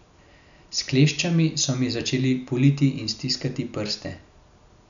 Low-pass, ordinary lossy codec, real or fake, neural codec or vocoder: 7.2 kHz; none; real; none